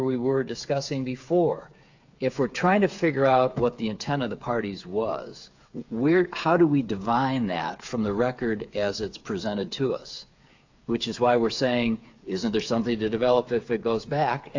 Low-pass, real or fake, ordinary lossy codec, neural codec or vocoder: 7.2 kHz; fake; AAC, 48 kbps; codec, 16 kHz, 8 kbps, FreqCodec, smaller model